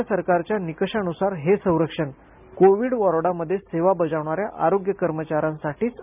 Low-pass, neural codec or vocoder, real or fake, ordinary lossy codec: 3.6 kHz; none; real; none